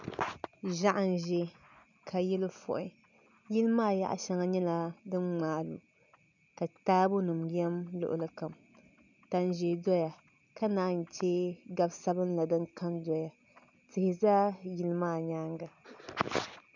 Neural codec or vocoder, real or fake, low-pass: none; real; 7.2 kHz